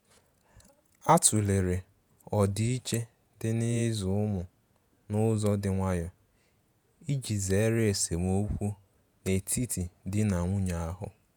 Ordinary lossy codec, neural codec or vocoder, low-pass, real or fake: none; vocoder, 48 kHz, 128 mel bands, Vocos; none; fake